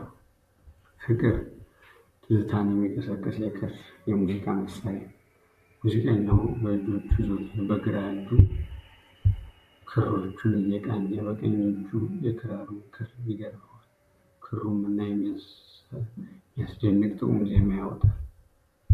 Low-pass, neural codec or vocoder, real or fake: 14.4 kHz; vocoder, 44.1 kHz, 128 mel bands, Pupu-Vocoder; fake